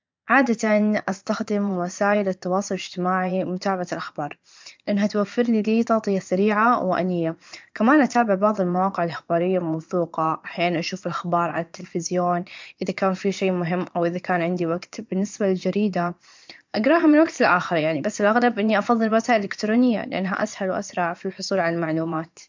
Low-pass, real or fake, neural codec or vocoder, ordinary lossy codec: 7.2 kHz; fake; vocoder, 22.05 kHz, 80 mel bands, Vocos; MP3, 64 kbps